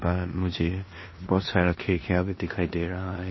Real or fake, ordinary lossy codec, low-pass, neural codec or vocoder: fake; MP3, 24 kbps; 7.2 kHz; codec, 16 kHz in and 24 kHz out, 0.9 kbps, LongCat-Audio-Codec, four codebook decoder